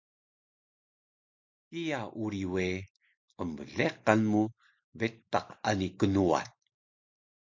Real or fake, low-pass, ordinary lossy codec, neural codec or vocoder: real; 7.2 kHz; MP3, 48 kbps; none